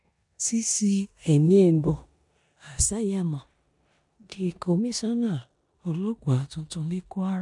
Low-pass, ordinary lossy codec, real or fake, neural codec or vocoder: 10.8 kHz; MP3, 96 kbps; fake; codec, 16 kHz in and 24 kHz out, 0.9 kbps, LongCat-Audio-Codec, four codebook decoder